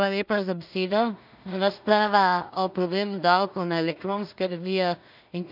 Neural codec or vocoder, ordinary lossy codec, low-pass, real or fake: codec, 16 kHz in and 24 kHz out, 0.4 kbps, LongCat-Audio-Codec, two codebook decoder; none; 5.4 kHz; fake